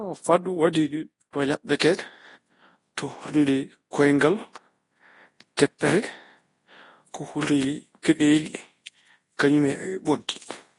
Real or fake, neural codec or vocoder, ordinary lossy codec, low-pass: fake; codec, 24 kHz, 0.9 kbps, WavTokenizer, large speech release; AAC, 32 kbps; 10.8 kHz